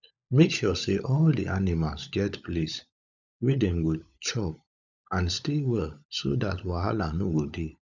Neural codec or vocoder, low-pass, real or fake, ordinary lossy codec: codec, 16 kHz, 16 kbps, FunCodec, trained on LibriTTS, 50 frames a second; 7.2 kHz; fake; none